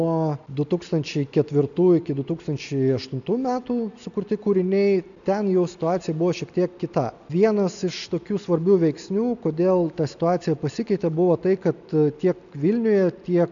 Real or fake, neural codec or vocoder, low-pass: real; none; 7.2 kHz